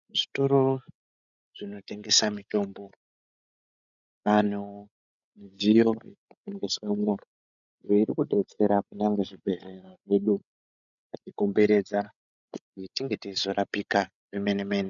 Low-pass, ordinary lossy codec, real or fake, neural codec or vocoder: 7.2 kHz; MP3, 96 kbps; fake; codec, 16 kHz, 16 kbps, FreqCodec, larger model